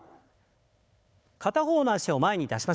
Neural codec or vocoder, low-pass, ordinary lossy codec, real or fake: codec, 16 kHz, 4 kbps, FunCodec, trained on Chinese and English, 50 frames a second; none; none; fake